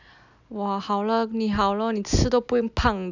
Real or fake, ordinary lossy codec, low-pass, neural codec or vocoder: real; none; 7.2 kHz; none